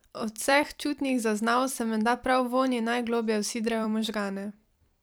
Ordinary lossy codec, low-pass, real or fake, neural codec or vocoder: none; none; fake; vocoder, 44.1 kHz, 128 mel bands every 256 samples, BigVGAN v2